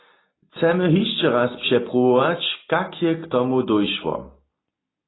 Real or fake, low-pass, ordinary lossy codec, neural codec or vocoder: real; 7.2 kHz; AAC, 16 kbps; none